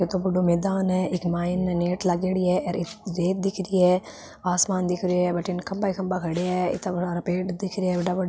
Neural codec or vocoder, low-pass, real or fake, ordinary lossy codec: none; none; real; none